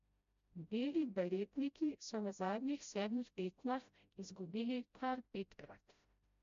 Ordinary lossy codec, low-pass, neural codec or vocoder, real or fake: MP3, 48 kbps; 7.2 kHz; codec, 16 kHz, 0.5 kbps, FreqCodec, smaller model; fake